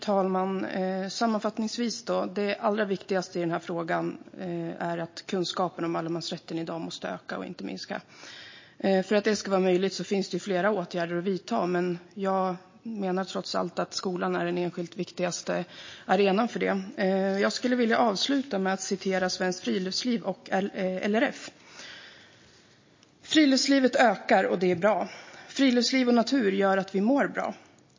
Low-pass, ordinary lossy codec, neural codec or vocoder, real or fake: 7.2 kHz; MP3, 32 kbps; none; real